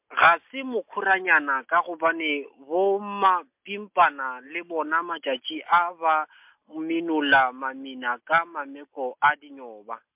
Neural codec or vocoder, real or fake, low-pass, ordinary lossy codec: none; real; 3.6 kHz; MP3, 32 kbps